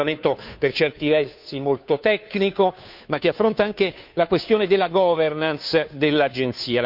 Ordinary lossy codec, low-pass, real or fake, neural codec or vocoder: none; 5.4 kHz; fake; codec, 16 kHz, 2 kbps, FunCodec, trained on Chinese and English, 25 frames a second